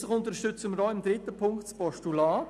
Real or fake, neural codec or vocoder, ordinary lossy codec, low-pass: real; none; none; none